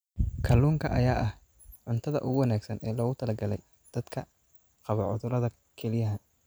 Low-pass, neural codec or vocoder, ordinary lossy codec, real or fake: none; none; none; real